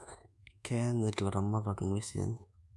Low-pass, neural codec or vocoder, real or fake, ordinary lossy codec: none; codec, 24 kHz, 1.2 kbps, DualCodec; fake; none